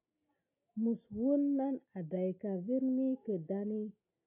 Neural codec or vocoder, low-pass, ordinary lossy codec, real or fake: none; 3.6 kHz; AAC, 32 kbps; real